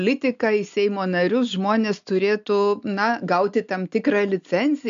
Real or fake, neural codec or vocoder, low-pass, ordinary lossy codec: real; none; 7.2 kHz; MP3, 64 kbps